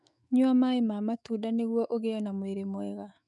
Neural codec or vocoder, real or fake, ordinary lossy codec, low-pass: autoencoder, 48 kHz, 128 numbers a frame, DAC-VAE, trained on Japanese speech; fake; none; 10.8 kHz